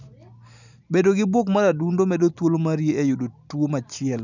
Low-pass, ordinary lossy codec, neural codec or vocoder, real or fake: 7.2 kHz; none; none; real